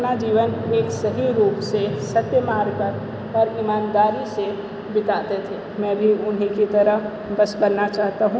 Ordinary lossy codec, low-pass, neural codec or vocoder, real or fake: none; none; none; real